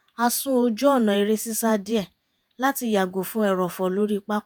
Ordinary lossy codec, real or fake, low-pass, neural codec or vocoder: none; fake; none; vocoder, 48 kHz, 128 mel bands, Vocos